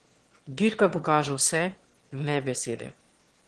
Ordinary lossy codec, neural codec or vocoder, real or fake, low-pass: Opus, 16 kbps; autoencoder, 22.05 kHz, a latent of 192 numbers a frame, VITS, trained on one speaker; fake; 9.9 kHz